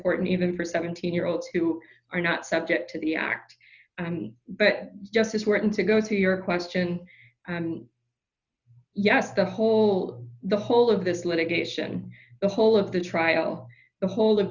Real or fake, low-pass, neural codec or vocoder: real; 7.2 kHz; none